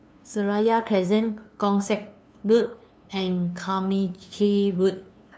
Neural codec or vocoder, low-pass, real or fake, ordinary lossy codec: codec, 16 kHz, 2 kbps, FunCodec, trained on LibriTTS, 25 frames a second; none; fake; none